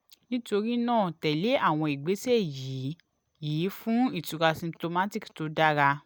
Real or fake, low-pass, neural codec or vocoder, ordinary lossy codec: real; none; none; none